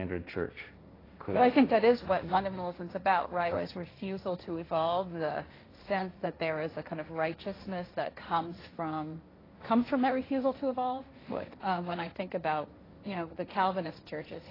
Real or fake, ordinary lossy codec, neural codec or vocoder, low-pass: fake; AAC, 24 kbps; codec, 16 kHz, 1.1 kbps, Voila-Tokenizer; 5.4 kHz